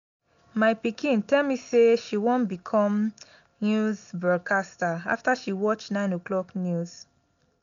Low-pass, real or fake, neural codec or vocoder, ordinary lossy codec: 7.2 kHz; real; none; none